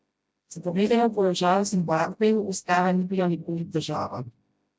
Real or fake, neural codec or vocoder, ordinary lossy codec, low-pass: fake; codec, 16 kHz, 0.5 kbps, FreqCodec, smaller model; none; none